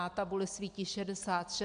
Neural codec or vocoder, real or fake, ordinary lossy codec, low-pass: none; real; AAC, 64 kbps; 9.9 kHz